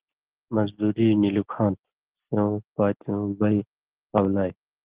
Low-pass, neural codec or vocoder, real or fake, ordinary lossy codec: 3.6 kHz; none; real; Opus, 16 kbps